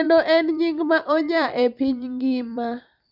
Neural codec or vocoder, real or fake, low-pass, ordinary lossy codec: vocoder, 44.1 kHz, 128 mel bands every 256 samples, BigVGAN v2; fake; 5.4 kHz; none